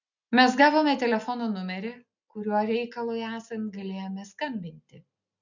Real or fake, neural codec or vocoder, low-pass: real; none; 7.2 kHz